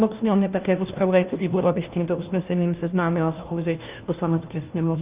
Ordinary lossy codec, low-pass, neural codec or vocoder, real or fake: Opus, 32 kbps; 3.6 kHz; codec, 16 kHz, 1 kbps, FunCodec, trained on LibriTTS, 50 frames a second; fake